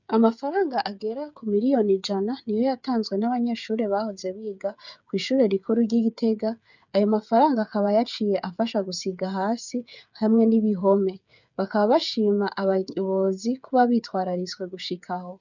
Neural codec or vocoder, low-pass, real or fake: codec, 16 kHz, 8 kbps, FreqCodec, smaller model; 7.2 kHz; fake